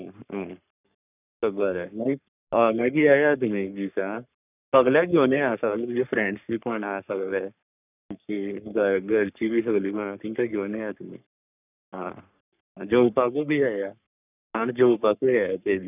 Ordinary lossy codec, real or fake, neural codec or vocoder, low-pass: none; fake; codec, 44.1 kHz, 3.4 kbps, Pupu-Codec; 3.6 kHz